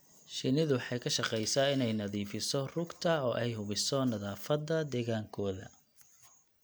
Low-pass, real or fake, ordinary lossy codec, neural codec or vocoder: none; real; none; none